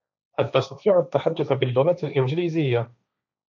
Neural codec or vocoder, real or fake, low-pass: codec, 16 kHz, 1.1 kbps, Voila-Tokenizer; fake; 7.2 kHz